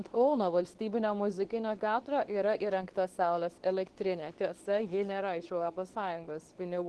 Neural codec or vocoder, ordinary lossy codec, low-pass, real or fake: codec, 24 kHz, 0.9 kbps, WavTokenizer, medium speech release version 2; Opus, 16 kbps; 10.8 kHz; fake